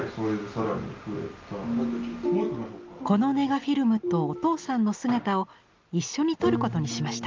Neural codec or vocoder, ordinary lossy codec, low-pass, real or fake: none; Opus, 32 kbps; 7.2 kHz; real